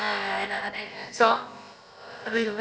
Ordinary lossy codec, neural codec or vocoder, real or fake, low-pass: none; codec, 16 kHz, about 1 kbps, DyCAST, with the encoder's durations; fake; none